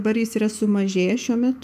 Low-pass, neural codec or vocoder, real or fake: 14.4 kHz; autoencoder, 48 kHz, 128 numbers a frame, DAC-VAE, trained on Japanese speech; fake